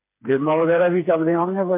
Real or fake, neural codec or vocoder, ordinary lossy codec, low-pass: fake; codec, 16 kHz, 4 kbps, FreqCodec, smaller model; MP3, 24 kbps; 3.6 kHz